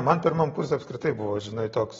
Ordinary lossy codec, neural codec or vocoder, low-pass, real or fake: AAC, 24 kbps; none; 7.2 kHz; real